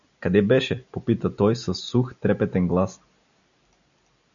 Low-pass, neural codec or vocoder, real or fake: 7.2 kHz; none; real